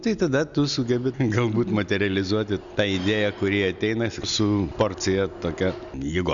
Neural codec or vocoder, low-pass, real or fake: none; 7.2 kHz; real